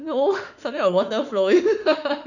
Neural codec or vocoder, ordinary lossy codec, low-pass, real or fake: autoencoder, 48 kHz, 32 numbers a frame, DAC-VAE, trained on Japanese speech; none; 7.2 kHz; fake